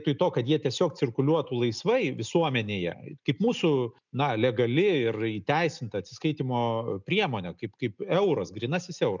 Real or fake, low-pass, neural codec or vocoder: real; 7.2 kHz; none